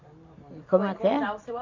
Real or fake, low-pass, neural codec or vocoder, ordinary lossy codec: real; 7.2 kHz; none; MP3, 64 kbps